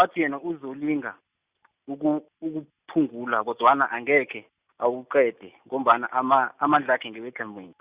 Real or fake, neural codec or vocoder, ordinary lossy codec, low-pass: real; none; Opus, 64 kbps; 3.6 kHz